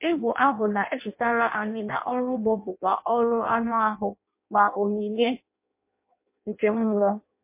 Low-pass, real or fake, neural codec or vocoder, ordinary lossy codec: 3.6 kHz; fake; codec, 16 kHz in and 24 kHz out, 0.6 kbps, FireRedTTS-2 codec; MP3, 24 kbps